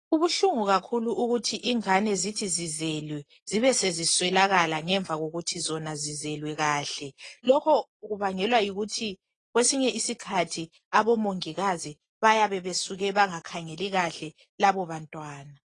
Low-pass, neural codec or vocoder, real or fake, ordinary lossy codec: 10.8 kHz; none; real; AAC, 32 kbps